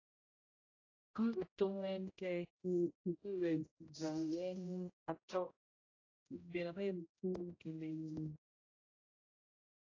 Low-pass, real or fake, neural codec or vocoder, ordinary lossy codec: 7.2 kHz; fake; codec, 16 kHz, 0.5 kbps, X-Codec, HuBERT features, trained on general audio; AAC, 32 kbps